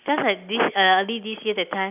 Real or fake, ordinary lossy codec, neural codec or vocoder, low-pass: real; none; none; 3.6 kHz